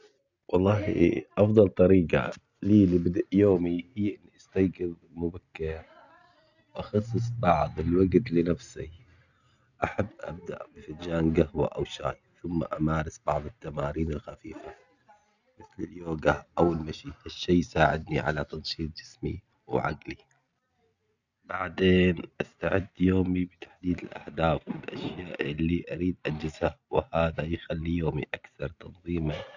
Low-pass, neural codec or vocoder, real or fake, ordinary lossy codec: 7.2 kHz; none; real; none